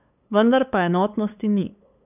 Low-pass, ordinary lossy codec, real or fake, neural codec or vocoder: 3.6 kHz; none; fake; codec, 16 kHz, 8 kbps, FunCodec, trained on LibriTTS, 25 frames a second